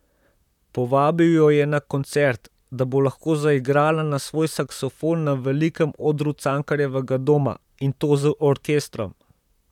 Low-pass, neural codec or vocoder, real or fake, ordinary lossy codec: 19.8 kHz; vocoder, 44.1 kHz, 128 mel bands, Pupu-Vocoder; fake; none